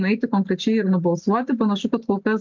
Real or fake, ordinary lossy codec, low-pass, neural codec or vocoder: real; MP3, 64 kbps; 7.2 kHz; none